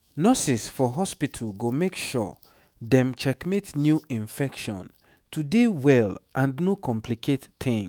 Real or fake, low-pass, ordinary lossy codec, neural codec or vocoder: fake; none; none; autoencoder, 48 kHz, 128 numbers a frame, DAC-VAE, trained on Japanese speech